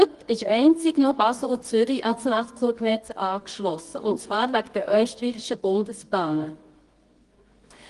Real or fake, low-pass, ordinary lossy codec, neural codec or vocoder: fake; 10.8 kHz; Opus, 24 kbps; codec, 24 kHz, 0.9 kbps, WavTokenizer, medium music audio release